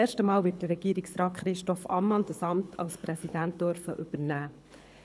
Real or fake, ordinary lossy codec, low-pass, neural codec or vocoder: fake; none; none; codec, 24 kHz, 6 kbps, HILCodec